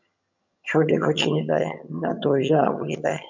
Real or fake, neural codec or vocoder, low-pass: fake; vocoder, 22.05 kHz, 80 mel bands, HiFi-GAN; 7.2 kHz